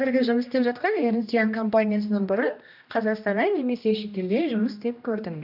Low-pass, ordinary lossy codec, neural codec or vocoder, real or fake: 5.4 kHz; none; codec, 16 kHz, 1 kbps, X-Codec, HuBERT features, trained on general audio; fake